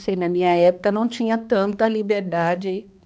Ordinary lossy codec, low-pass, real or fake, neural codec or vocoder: none; none; fake; codec, 16 kHz, 2 kbps, X-Codec, HuBERT features, trained on balanced general audio